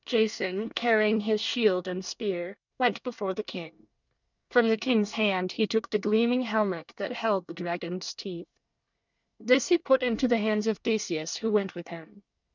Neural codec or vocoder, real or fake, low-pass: codec, 24 kHz, 1 kbps, SNAC; fake; 7.2 kHz